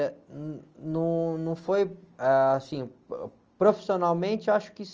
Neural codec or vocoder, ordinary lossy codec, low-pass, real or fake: none; Opus, 24 kbps; 7.2 kHz; real